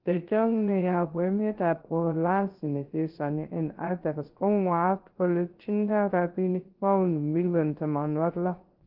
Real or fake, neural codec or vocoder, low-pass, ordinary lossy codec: fake; codec, 16 kHz, 0.3 kbps, FocalCodec; 5.4 kHz; Opus, 16 kbps